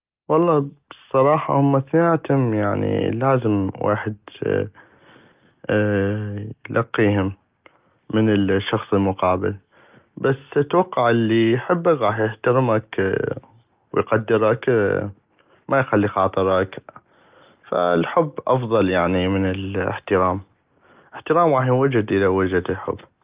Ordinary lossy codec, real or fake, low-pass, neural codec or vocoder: Opus, 24 kbps; real; 3.6 kHz; none